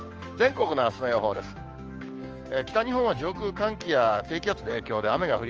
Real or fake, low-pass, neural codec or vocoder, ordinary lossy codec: fake; 7.2 kHz; codec, 16 kHz, 6 kbps, DAC; Opus, 24 kbps